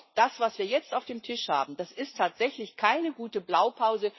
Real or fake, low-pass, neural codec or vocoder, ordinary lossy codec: fake; 7.2 kHz; vocoder, 44.1 kHz, 80 mel bands, Vocos; MP3, 24 kbps